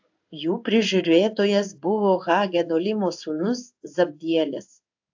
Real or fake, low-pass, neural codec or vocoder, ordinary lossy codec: fake; 7.2 kHz; codec, 16 kHz in and 24 kHz out, 1 kbps, XY-Tokenizer; AAC, 48 kbps